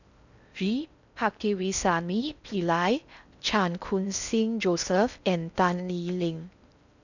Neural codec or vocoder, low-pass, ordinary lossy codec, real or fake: codec, 16 kHz in and 24 kHz out, 0.6 kbps, FocalCodec, streaming, 4096 codes; 7.2 kHz; none; fake